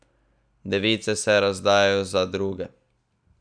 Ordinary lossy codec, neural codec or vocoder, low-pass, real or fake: none; none; 9.9 kHz; real